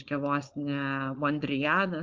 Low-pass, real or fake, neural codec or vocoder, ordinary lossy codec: 7.2 kHz; fake; codec, 16 kHz, 4.8 kbps, FACodec; Opus, 32 kbps